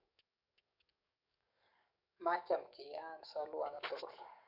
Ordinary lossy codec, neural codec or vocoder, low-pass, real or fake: none; codec, 44.1 kHz, 7.8 kbps, DAC; 5.4 kHz; fake